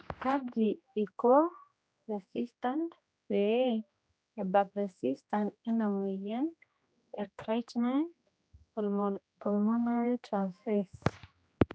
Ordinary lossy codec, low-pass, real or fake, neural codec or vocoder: none; none; fake; codec, 16 kHz, 1 kbps, X-Codec, HuBERT features, trained on general audio